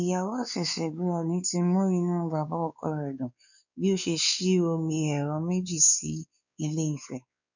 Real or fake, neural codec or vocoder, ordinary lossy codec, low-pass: fake; codec, 16 kHz, 4 kbps, X-Codec, WavLM features, trained on Multilingual LibriSpeech; none; 7.2 kHz